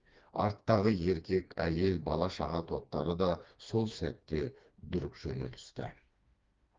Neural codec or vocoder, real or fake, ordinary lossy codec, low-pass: codec, 16 kHz, 2 kbps, FreqCodec, smaller model; fake; Opus, 32 kbps; 7.2 kHz